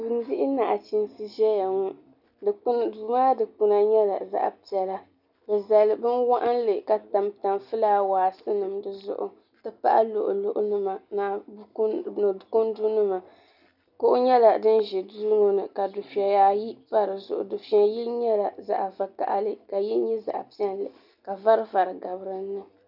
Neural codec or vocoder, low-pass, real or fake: none; 5.4 kHz; real